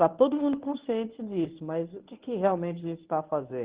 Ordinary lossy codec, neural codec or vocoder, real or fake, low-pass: Opus, 16 kbps; codec, 24 kHz, 0.9 kbps, WavTokenizer, medium speech release version 1; fake; 3.6 kHz